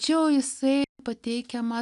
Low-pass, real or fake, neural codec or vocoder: 10.8 kHz; real; none